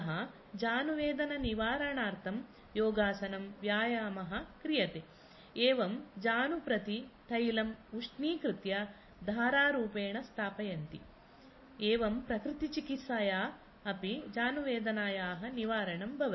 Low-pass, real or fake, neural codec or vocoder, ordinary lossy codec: 7.2 kHz; real; none; MP3, 24 kbps